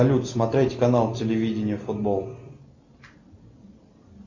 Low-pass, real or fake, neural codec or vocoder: 7.2 kHz; real; none